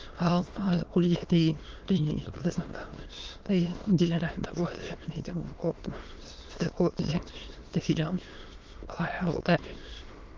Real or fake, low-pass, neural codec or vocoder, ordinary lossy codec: fake; 7.2 kHz; autoencoder, 22.05 kHz, a latent of 192 numbers a frame, VITS, trained on many speakers; Opus, 32 kbps